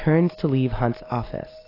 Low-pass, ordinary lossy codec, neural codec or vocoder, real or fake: 5.4 kHz; AAC, 24 kbps; none; real